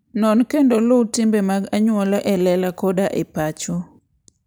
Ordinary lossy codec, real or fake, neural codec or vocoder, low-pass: none; real; none; none